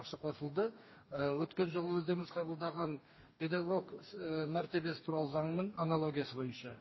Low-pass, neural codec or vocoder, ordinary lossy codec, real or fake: 7.2 kHz; codec, 44.1 kHz, 2.6 kbps, DAC; MP3, 24 kbps; fake